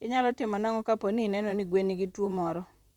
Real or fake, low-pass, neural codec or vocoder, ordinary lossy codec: fake; 19.8 kHz; vocoder, 44.1 kHz, 128 mel bands, Pupu-Vocoder; Opus, 64 kbps